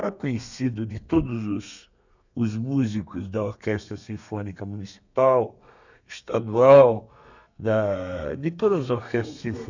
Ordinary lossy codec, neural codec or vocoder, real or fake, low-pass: none; codec, 32 kHz, 1.9 kbps, SNAC; fake; 7.2 kHz